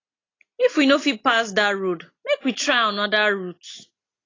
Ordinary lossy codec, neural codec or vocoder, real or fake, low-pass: AAC, 32 kbps; none; real; 7.2 kHz